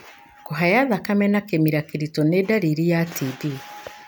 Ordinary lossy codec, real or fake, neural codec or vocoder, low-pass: none; real; none; none